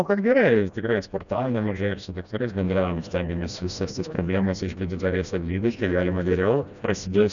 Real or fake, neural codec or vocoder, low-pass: fake; codec, 16 kHz, 1 kbps, FreqCodec, smaller model; 7.2 kHz